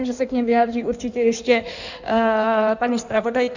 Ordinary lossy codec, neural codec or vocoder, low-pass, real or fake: Opus, 64 kbps; codec, 16 kHz in and 24 kHz out, 1.1 kbps, FireRedTTS-2 codec; 7.2 kHz; fake